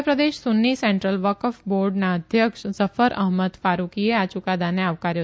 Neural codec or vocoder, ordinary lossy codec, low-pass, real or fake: none; none; none; real